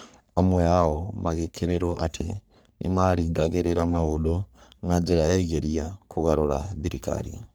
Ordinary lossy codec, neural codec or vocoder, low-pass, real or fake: none; codec, 44.1 kHz, 3.4 kbps, Pupu-Codec; none; fake